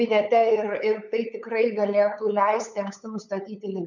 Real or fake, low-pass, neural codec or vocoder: fake; 7.2 kHz; codec, 16 kHz, 8 kbps, FunCodec, trained on LibriTTS, 25 frames a second